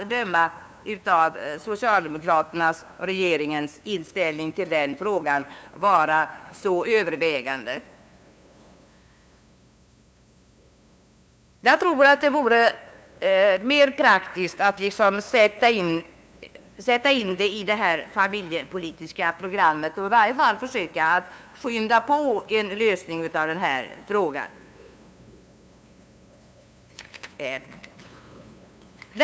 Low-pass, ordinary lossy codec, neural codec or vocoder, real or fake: none; none; codec, 16 kHz, 2 kbps, FunCodec, trained on LibriTTS, 25 frames a second; fake